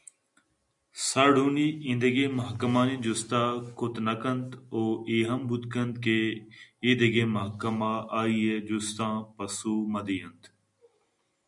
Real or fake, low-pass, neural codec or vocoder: real; 10.8 kHz; none